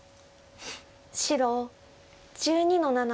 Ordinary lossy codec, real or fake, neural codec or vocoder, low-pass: none; real; none; none